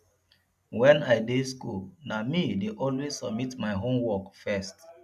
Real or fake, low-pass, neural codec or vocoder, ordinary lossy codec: real; 14.4 kHz; none; none